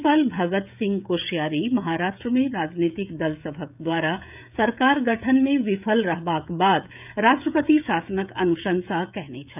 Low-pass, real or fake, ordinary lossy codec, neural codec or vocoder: 3.6 kHz; fake; none; codec, 16 kHz, 16 kbps, FreqCodec, smaller model